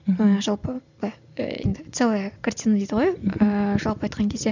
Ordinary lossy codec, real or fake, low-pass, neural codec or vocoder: none; real; 7.2 kHz; none